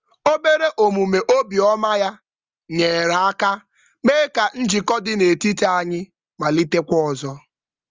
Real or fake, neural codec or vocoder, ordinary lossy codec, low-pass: real; none; Opus, 32 kbps; 7.2 kHz